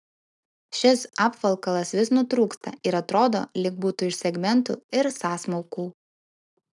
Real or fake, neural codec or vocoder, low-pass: real; none; 10.8 kHz